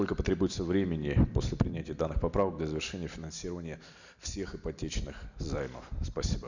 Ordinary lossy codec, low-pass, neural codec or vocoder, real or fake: none; 7.2 kHz; none; real